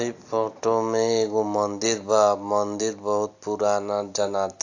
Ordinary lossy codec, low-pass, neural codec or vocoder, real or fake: AAC, 48 kbps; 7.2 kHz; none; real